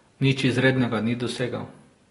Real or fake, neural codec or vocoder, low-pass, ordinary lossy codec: real; none; 10.8 kHz; AAC, 32 kbps